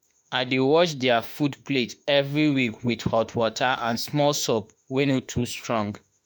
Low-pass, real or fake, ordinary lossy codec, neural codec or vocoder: none; fake; none; autoencoder, 48 kHz, 32 numbers a frame, DAC-VAE, trained on Japanese speech